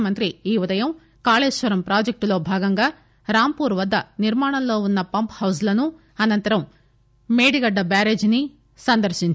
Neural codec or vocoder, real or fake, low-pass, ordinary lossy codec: none; real; none; none